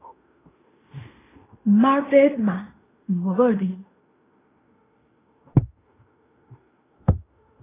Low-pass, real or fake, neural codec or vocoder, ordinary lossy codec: 3.6 kHz; fake; codec, 16 kHz in and 24 kHz out, 0.9 kbps, LongCat-Audio-Codec, fine tuned four codebook decoder; AAC, 16 kbps